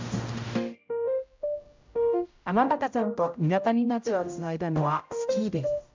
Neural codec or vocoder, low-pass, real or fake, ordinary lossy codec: codec, 16 kHz, 0.5 kbps, X-Codec, HuBERT features, trained on general audio; 7.2 kHz; fake; MP3, 64 kbps